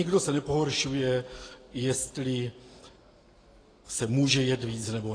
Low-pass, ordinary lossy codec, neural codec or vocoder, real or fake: 9.9 kHz; AAC, 32 kbps; none; real